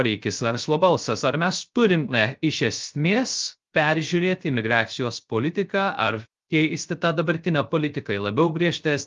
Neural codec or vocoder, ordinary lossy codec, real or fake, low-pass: codec, 16 kHz, 0.3 kbps, FocalCodec; Opus, 32 kbps; fake; 7.2 kHz